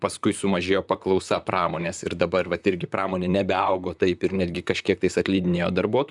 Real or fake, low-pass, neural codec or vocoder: fake; 10.8 kHz; vocoder, 44.1 kHz, 128 mel bands, Pupu-Vocoder